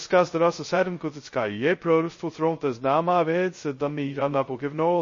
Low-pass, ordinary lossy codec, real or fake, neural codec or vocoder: 7.2 kHz; MP3, 32 kbps; fake; codec, 16 kHz, 0.2 kbps, FocalCodec